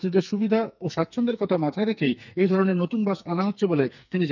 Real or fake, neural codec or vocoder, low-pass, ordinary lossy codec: fake; codec, 44.1 kHz, 2.6 kbps, SNAC; 7.2 kHz; none